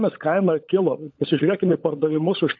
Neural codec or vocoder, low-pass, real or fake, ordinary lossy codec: codec, 16 kHz, 16 kbps, FunCodec, trained on LibriTTS, 50 frames a second; 7.2 kHz; fake; AAC, 48 kbps